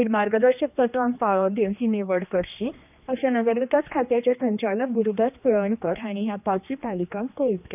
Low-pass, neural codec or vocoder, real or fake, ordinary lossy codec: 3.6 kHz; codec, 16 kHz, 2 kbps, X-Codec, HuBERT features, trained on general audio; fake; none